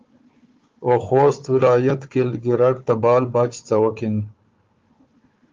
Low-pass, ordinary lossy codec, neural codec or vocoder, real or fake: 7.2 kHz; Opus, 32 kbps; codec, 16 kHz, 4 kbps, FunCodec, trained on Chinese and English, 50 frames a second; fake